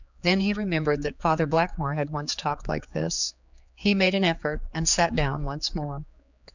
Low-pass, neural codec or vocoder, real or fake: 7.2 kHz; codec, 16 kHz, 4 kbps, X-Codec, HuBERT features, trained on general audio; fake